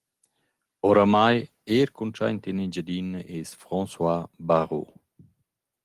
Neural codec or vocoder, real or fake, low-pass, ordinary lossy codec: none; real; 14.4 kHz; Opus, 24 kbps